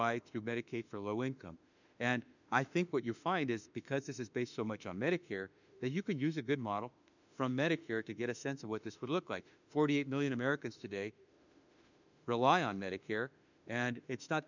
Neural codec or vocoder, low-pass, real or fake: autoencoder, 48 kHz, 32 numbers a frame, DAC-VAE, trained on Japanese speech; 7.2 kHz; fake